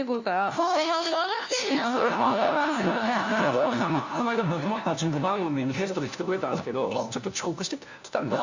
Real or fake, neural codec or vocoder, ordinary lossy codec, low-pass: fake; codec, 16 kHz, 1 kbps, FunCodec, trained on LibriTTS, 50 frames a second; Opus, 64 kbps; 7.2 kHz